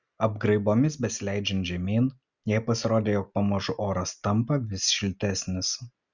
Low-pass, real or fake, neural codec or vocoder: 7.2 kHz; real; none